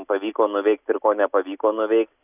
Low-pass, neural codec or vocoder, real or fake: 3.6 kHz; none; real